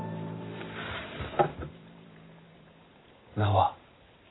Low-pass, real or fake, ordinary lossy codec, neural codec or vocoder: 7.2 kHz; fake; AAC, 16 kbps; vocoder, 44.1 kHz, 128 mel bands every 512 samples, BigVGAN v2